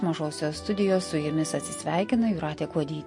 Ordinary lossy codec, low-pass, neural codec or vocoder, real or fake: MP3, 48 kbps; 10.8 kHz; none; real